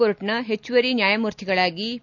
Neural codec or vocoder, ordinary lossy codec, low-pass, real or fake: none; none; 7.2 kHz; real